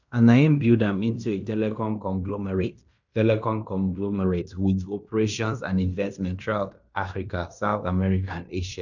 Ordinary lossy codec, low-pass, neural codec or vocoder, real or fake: none; 7.2 kHz; codec, 16 kHz in and 24 kHz out, 0.9 kbps, LongCat-Audio-Codec, fine tuned four codebook decoder; fake